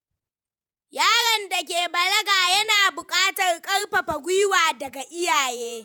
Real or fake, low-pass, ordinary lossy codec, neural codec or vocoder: fake; none; none; vocoder, 48 kHz, 128 mel bands, Vocos